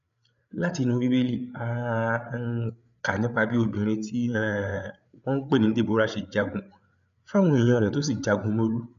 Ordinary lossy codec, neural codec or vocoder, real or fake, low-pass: MP3, 96 kbps; codec, 16 kHz, 8 kbps, FreqCodec, larger model; fake; 7.2 kHz